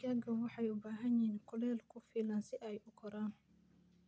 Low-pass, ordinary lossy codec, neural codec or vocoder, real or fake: none; none; none; real